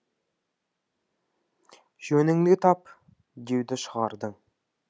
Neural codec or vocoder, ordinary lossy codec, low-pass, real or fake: none; none; none; real